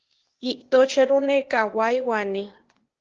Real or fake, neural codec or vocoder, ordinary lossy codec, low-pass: fake; codec, 16 kHz, 0.8 kbps, ZipCodec; Opus, 16 kbps; 7.2 kHz